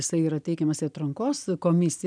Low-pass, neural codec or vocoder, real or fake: 9.9 kHz; none; real